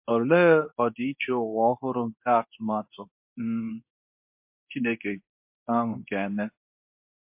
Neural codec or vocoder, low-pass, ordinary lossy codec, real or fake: codec, 24 kHz, 0.9 kbps, WavTokenizer, medium speech release version 2; 3.6 kHz; MP3, 32 kbps; fake